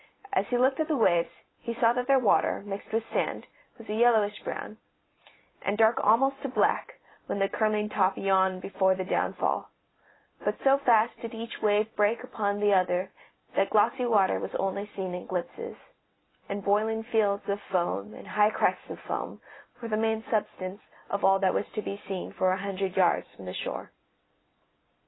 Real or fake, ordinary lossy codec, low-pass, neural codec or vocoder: real; AAC, 16 kbps; 7.2 kHz; none